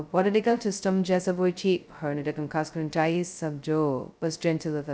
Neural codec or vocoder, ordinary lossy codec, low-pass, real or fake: codec, 16 kHz, 0.2 kbps, FocalCodec; none; none; fake